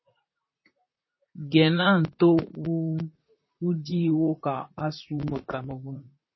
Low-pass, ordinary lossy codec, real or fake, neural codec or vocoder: 7.2 kHz; MP3, 24 kbps; fake; vocoder, 44.1 kHz, 128 mel bands, Pupu-Vocoder